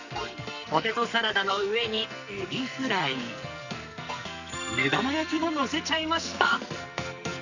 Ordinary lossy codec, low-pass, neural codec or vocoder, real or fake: none; 7.2 kHz; codec, 44.1 kHz, 2.6 kbps, SNAC; fake